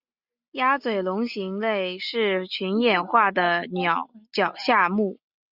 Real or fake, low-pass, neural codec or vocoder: real; 5.4 kHz; none